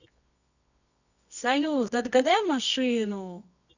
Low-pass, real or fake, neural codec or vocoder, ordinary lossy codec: 7.2 kHz; fake; codec, 24 kHz, 0.9 kbps, WavTokenizer, medium music audio release; none